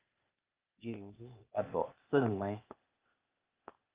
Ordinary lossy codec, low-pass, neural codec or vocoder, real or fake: Opus, 24 kbps; 3.6 kHz; codec, 16 kHz, 0.8 kbps, ZipCodec; fake